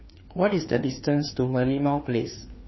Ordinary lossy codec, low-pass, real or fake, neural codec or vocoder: MP3, 24 kbps; 7.2 kHz; fake; codec, 16 kHz, 2 kbps, FreqCodec, larger model